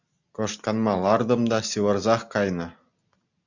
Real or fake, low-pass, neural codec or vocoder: real; 7.2 kHz; none